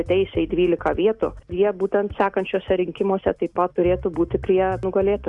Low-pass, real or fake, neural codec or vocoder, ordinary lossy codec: 10.8 kHz; real; none; Opus, 64 kbps